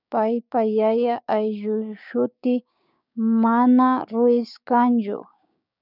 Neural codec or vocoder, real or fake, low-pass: codec, 16 kHz, 6 kbps, DAC; fake; 5.4 kHz